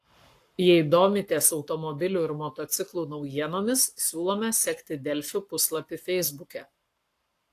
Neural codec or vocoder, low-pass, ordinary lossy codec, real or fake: codec, 44.1 kHz, 7.8 kbps, Pupu-Codec; 14.4 kHz; AAC, 64 kbps; fake